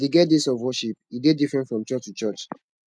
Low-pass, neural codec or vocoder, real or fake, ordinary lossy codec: none; none; real; none